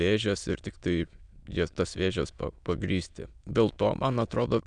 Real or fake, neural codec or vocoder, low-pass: fake; autoencoder, 22.05 kHz, a latent of 192 numbers a frame, VITS, trained on many speakers; 9.9 kHz